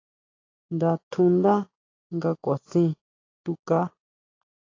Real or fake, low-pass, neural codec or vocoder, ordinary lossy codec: real; 7.2 kHz; none; AAC, 32 kbps